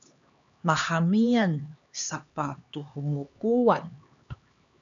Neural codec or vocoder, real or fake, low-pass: codec, 16 kHz, 2 kbps, X-Codec, HuBERT features, trained on LibriSpeech; fake; 7.2 kHz